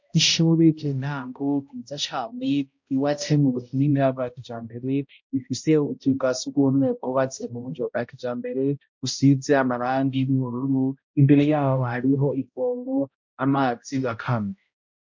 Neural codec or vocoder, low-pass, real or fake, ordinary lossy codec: codec, 16 kHz, 0.5 kbps, X-Codec, HuBERT features, trained on balanced general audio; 7.2 kHz; fake; MP3, 48 kbps